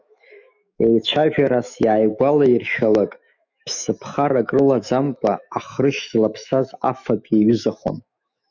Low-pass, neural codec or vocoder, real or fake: 7.2 kHz; autoencoder, 48 kHz, 128 numbers a frame, DAC-VAE, trained on Japanese speech; fake